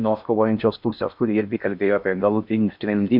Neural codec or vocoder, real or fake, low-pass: codec, 16 kHz in and 24 kHz out, 0.6 kbps, FocalCodec, streaming, 2048 codes; fake; 5.4 kHz